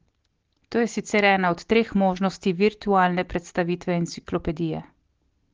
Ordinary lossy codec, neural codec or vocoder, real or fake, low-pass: Opus, 16 kbps; none; real; 7.2 kHz